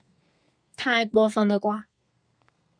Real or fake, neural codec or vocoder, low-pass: fake; codec, 32 kHz, 1.9 kbps, SNAC; 9.9 kHz